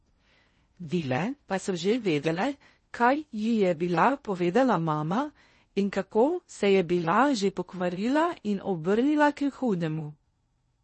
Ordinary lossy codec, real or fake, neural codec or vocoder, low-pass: MP3, 32 kbps; fake; codec, 16 kHz in and 24 kHz out, 0.6 kbps, FocalCodec, streaming, 4096 codes; 10.8 kHz